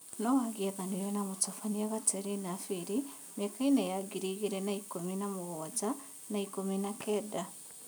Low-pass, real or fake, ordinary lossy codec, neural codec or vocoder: none; real; none; none